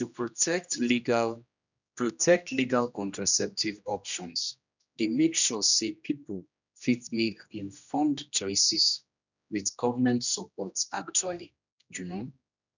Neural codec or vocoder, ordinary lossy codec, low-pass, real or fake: codec, 16 kHz, 1 kbps, X-Codec, HuBERT features, trained on general audio; none; 7.2 kHz; fake